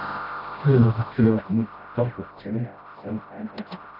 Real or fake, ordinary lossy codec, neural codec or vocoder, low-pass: fake; AAC, 32 kbps; codec, 16 kHz, 0.5 kbps, FreqCodec, smaller model; 5.4 kHz